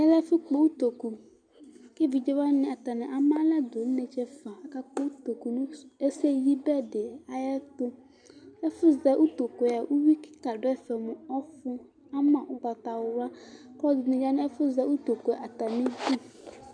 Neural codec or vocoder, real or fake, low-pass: none; real; 9.9 kHz